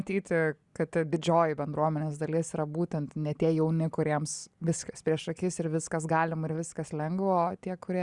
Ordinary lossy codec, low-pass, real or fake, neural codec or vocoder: Opus, 64 kbps; 10.8 kHz; real; none